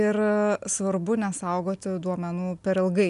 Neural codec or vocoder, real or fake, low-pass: none; real; 10.8 kHz